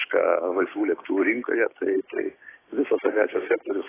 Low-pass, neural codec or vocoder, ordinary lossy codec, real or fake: 3.6 kHz; codec, 16 kHz, 6 kbps, DAC; AAC, 16 kbps; fake